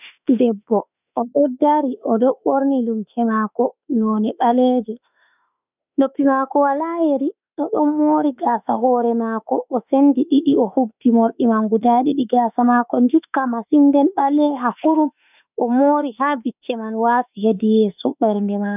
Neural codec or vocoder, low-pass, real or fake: autoencoder, 48 kHz, 32 numbers a frame, DAC-VAE, trained on Japanese speech; 3.6 kHz; fake